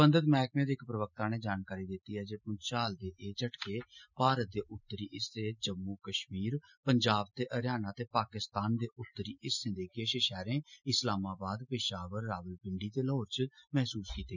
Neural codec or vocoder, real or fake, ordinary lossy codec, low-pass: none; real; none; 7.2 kHz